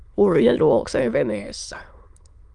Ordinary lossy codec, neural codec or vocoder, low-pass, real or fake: Opus, 32 kbps; autoencoder, 22.05 kHz, a latent of 192 numbers a frame, VITS, trained on many speakers; 9.9 kHz; fake